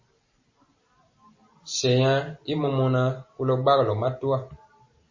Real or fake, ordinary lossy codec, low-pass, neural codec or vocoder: real; MP3, 32 kbps; 7.2 kHz; none